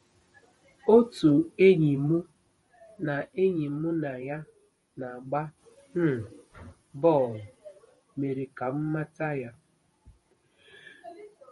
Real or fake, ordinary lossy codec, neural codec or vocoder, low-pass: fake; MP3, 48 kbps; vocoder, 48 kHz, 128 mel bands, Vocos; 19.8 kHz